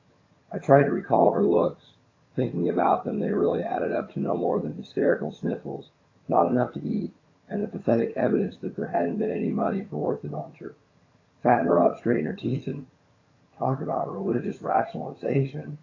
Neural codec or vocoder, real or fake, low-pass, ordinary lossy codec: vocoder, 22.05 kHz, 80 mel bands, HiFi-GAN; fake; 7.2 kHz; AAC, 32 kbps